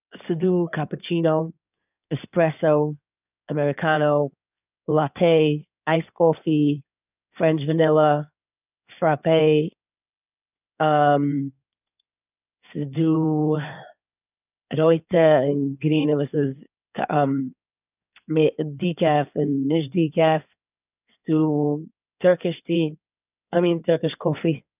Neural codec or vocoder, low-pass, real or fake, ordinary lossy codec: codec, 16 kHz in and 24 kHz out, 2.2 kbps, FireRedTTS-2 codec; 3.6 kHz; fake; none